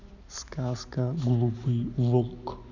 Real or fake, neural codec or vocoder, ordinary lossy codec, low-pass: real; none; none; 7.2 kHz